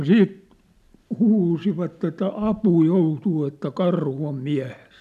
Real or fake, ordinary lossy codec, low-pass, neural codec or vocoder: real; none; 14.4 kHz; none